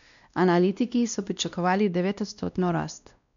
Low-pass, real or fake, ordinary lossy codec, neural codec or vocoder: 7.2 kHz; fake; none; codec, 16 kHz, 1 kbps, X-Codec, WavLM features, trained on Multilingual LibriSpeech